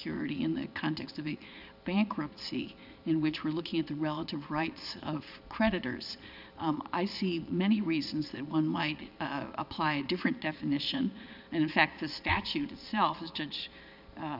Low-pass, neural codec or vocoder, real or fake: 5.4 kHz; vocoder, 22.05 kHz, 80 mel bands, Vocos; fake